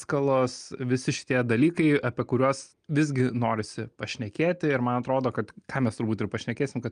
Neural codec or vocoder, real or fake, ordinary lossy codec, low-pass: none; real; Opus, 32 kbps; 9.9 kHz